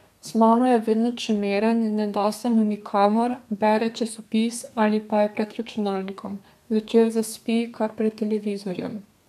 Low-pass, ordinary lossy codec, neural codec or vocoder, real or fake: 14.4 kHz; none; codec, 32 kHz, 1.9 kbps, SNAC; fake